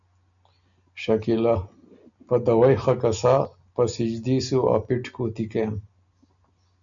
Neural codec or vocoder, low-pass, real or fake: none; 7.2 kHz; real